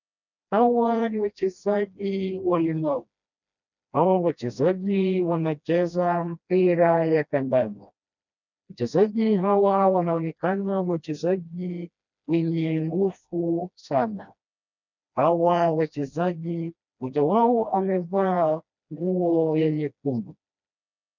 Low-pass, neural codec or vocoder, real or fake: 7.2 kHz; codec, 16 kHz, 1 kbps, FreqCodec, smaller model; fake